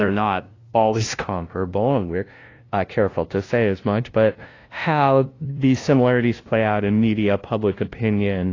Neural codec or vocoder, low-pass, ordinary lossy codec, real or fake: codec, 16 kHz, 0.5 kbps, FunCodec, trained on LibriTTS, 25 frames a second; 7.2 kHz; AAC, 32 kbps; fake